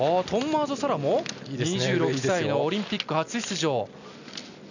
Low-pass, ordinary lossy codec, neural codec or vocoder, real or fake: 7.2 kHz; none; none; real